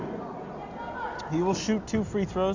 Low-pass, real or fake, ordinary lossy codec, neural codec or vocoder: 7.2 kHz; real; Opus, 64 kbps; none